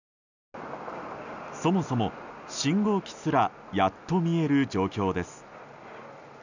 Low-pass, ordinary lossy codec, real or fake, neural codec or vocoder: 7.2 kHz; none; real; none